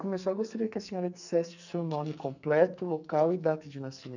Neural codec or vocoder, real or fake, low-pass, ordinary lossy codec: codec, 44.1 kHz, 2.6 kbps, SNAC; fake; 7.2 kHz; none